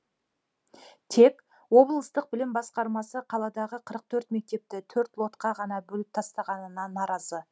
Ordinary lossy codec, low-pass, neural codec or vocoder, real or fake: none; none; none; real